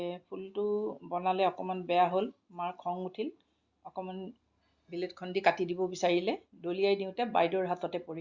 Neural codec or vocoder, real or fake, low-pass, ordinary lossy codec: none; real; 7.2 kHz; Opus, 64 kbps